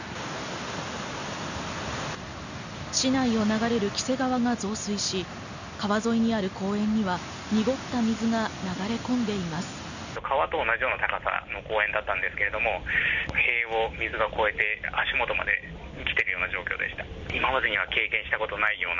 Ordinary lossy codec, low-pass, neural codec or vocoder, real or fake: none; 7.2 kHz; none; real